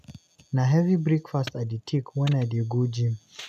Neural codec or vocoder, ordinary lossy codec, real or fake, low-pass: none; none; real; 14.4 kHz